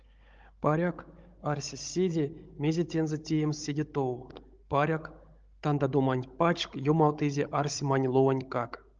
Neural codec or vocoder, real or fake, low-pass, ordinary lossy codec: codec, 16 kHz, 16 kbps, FunCodec, trained on Chinese and English, 50 frames a second; fake; 7.2 kHz; Opus, 24 kbps